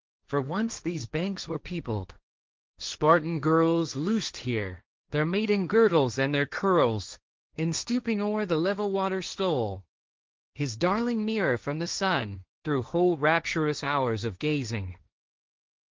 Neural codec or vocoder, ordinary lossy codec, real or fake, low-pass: codec, 16 kHz, 1.1 kbps, Voila-Tokenizer; Opus, 32 kbps; fake; 7.2 kHz